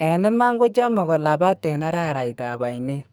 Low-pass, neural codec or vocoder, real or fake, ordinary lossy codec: none; codec, 44.1 kHz, 2.6 kbps, SNAC; fake; none